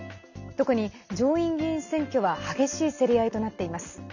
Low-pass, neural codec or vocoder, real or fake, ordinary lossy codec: 7.2 kHz; none; real; none